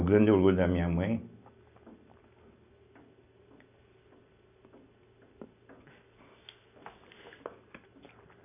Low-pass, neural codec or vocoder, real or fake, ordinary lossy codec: 3.6 kHz; none; real; none